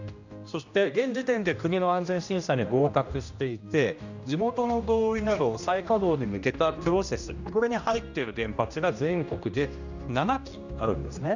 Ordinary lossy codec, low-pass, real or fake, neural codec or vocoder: none; 7.2 kHz; fake; codec, 16 kHz, 1 kbps, X-Codec, HuBERT features, trained on general audio